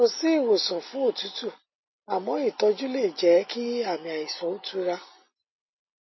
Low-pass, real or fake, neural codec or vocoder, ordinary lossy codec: 7.2 kHz; real; none; MP3, 24 kbps